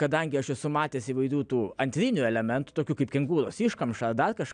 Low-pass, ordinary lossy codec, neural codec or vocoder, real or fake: 9.9 kHz; AAC, 96 kbps; none; real